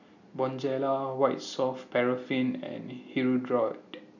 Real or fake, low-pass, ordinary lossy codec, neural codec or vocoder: real; 7.2 kHz; none; none